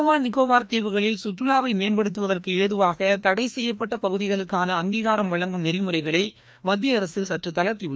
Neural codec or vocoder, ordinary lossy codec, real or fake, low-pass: codec, 16 kHz, 1 kbps, FreqCodec, larger model; none; fake; none